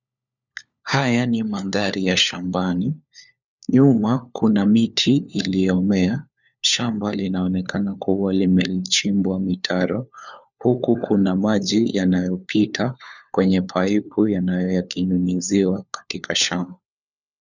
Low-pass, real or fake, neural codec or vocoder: 7.2 kHz; fake; codec, 16 kHz, 4 kbps, FunCodec, trained on LibriTTS, 50 frames a second